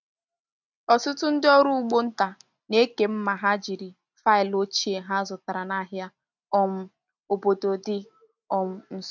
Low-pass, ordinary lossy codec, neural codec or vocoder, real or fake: 7.2 kHz; none; none; real